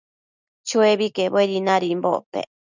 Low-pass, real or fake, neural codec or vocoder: 7.2 kHz; real; none